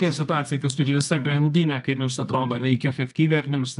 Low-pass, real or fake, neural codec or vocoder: 10.8 kHz; fake; codec, 24 kHz, 0.9 kbps, WavTokenizer, medium music audio release